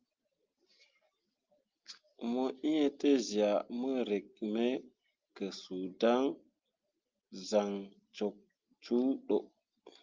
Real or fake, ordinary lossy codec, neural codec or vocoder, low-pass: real; Opus, 24 kbps; none; 7.2 kHz